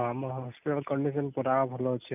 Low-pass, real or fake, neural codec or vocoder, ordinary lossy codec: 3.6 kHz; real; none; none